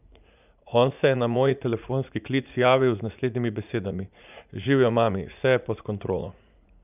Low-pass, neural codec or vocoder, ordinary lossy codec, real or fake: 3.6 kHz; vocoder, 44.1 kHz, 80 mel bands, Vocos; none; fake